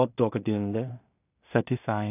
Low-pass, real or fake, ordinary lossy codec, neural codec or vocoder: 3.6 kHz; fake; none; codec, 16 kHz in and 24 kHz out, 0.4 kbps, LongCat-Audio-Codec, two codebook decoder